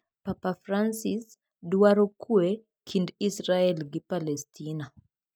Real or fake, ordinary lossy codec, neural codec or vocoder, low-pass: real; none; none; 19.8 kHz